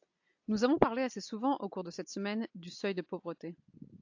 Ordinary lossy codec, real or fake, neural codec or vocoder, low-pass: AAC, 48 kbps; real; none; 7.2 kHz